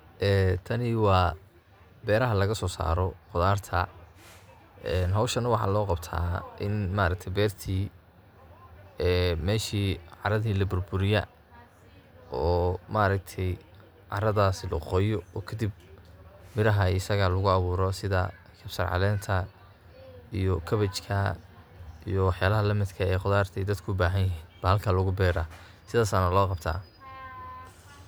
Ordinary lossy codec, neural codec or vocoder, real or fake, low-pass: none; none; real; none